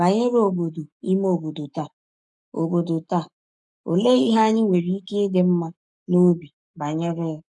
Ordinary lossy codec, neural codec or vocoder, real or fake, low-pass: none; codec, 44.1 kHz, 7.8 kbps, Pupu-Codec; fake; 10.8 kHz